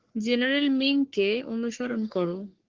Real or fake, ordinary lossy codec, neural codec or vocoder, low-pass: fake; Opus, 16 kbps; codec, 44.1 kHz, 3.4 kbps, Pupu-Codec; 7.2 kHz